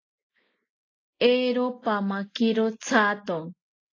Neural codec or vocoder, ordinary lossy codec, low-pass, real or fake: none; AAC, 32 kbps; 7.2 kHz; real